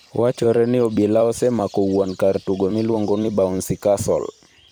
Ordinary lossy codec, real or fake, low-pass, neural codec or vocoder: none; fake; none; vocoder, 44.1 kHz, 128 mel bands every 256 samples, BigVGAN v2